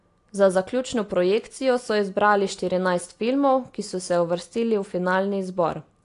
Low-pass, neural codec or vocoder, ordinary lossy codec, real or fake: 10.8 kHz; none; AAC, 48 kbps; real